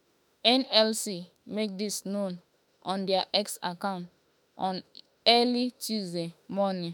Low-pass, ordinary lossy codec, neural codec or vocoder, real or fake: none; none; autoencoder, 48 kHz, 32 numbers a frame, DAC-VAE, trained on Japanese speech; fake